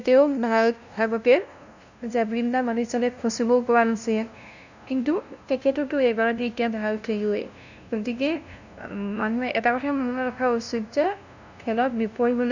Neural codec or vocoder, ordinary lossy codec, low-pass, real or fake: codec, 16 kHz, 0.5 kbps, FunCodec, trained on LibriTTS, 25 frames a second; none; 7.2 kHz; fake